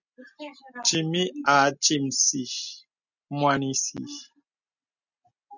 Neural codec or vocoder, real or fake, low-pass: none; real; 7.2 kHz